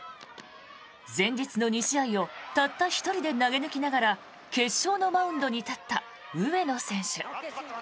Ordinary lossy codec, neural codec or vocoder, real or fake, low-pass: none; none; real; none